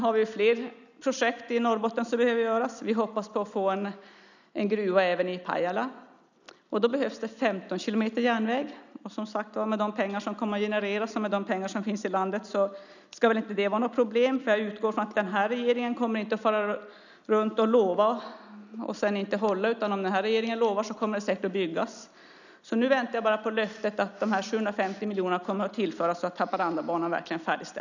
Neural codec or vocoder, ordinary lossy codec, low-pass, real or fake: none; none; 7.2 kHz; real